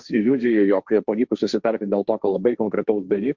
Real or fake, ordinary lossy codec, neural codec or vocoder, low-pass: fake; Opus, 64 kbps; codec, 16 kHz, 1.1 kbps, Voila-Tokenizer; 7.2 kHz